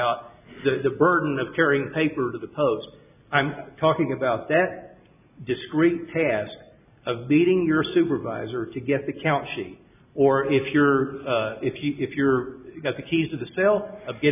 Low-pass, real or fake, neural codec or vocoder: 3.6 kHz; real; none